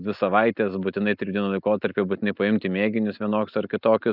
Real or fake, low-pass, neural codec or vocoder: real; 5.4 kHz; none